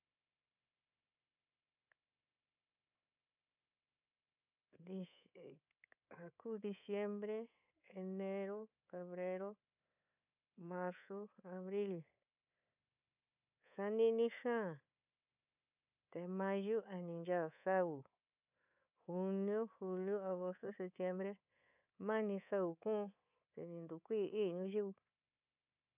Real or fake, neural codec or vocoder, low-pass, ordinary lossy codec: fake; codec, 24 kHz, 3.1 kbps, DualCodec; 3.6 kHz; none